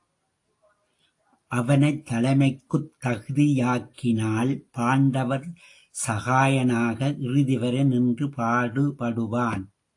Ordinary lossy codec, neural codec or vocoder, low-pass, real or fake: AAC, 48 kbps; none; 10.8 kHz; real